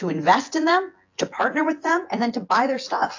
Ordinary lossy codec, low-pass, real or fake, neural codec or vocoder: AAC, 48 kbps; 7.2 kHz; fake; vocoder, 24 kHz, 100 mel bands, Vocos